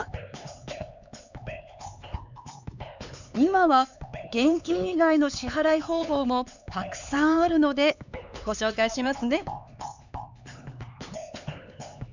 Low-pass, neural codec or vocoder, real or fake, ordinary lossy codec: 7.2 kHz; codec, 16 kHz, 4 kbps, X-Codec, HuBERT features, trained on LibriSpeech; fake; none